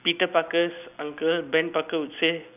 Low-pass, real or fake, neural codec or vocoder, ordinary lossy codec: 3.6 kHz; real; none; none